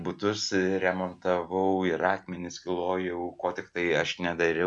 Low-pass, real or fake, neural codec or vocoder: 10.8 kHz; real; none